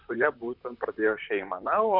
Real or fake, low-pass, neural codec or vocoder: fake; 5.4 kHz; vocoder, 44.1 kHz, 128 mel bands, Pupu-Vocoder